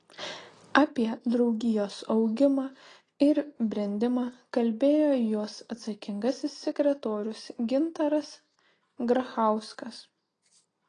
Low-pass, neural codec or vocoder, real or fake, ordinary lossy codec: 9.9 kHz; none; real; AAC, 32 kbps